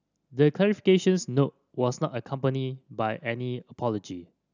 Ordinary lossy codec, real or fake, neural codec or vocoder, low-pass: none; real; none; 7.2 kHz